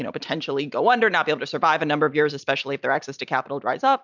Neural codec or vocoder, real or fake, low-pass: none; real; 7.2 kHz